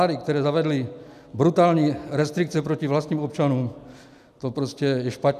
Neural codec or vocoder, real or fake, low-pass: none; real; 14.4 kHz